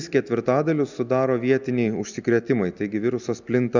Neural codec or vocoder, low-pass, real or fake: none; 7.2 kHz; real